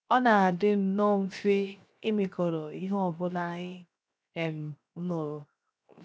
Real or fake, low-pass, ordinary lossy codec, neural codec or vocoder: fake; none; none; codec, 16 kHz, 0.7 kbps, FocalCodec